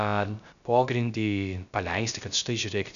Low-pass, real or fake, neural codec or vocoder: 7.2 kHz; fake; codec, 16 kHz, 0.3 kbps, FocalCodec